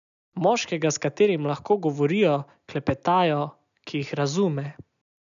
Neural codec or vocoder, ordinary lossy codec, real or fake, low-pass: none; none; real; 7.2 kHz